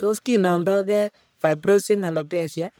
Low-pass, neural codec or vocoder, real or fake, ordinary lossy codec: none; codec, 44.1 kHz, 1.7 kbps, Pupu-Codec; fake; none